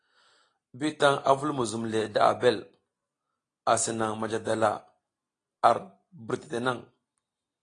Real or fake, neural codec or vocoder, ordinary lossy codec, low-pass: real; none; AAC, 32 kbps; 9.9 kHz